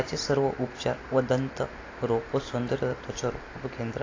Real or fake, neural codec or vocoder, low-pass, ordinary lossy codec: real; none; 7.2 kHz; AAC, 32 kbps